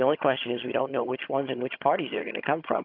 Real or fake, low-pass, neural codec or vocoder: fake; 5.4 kHz; vocoder, 22.05 kHz, 80 mel bands, HiFi-GAN